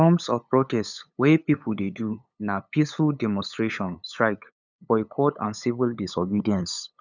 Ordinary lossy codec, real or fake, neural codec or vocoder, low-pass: none; fake; codec, 16 kHz, 8 kbps, FunCodec, trained on LibriTTS, 25 frames a second; 7.2 kHz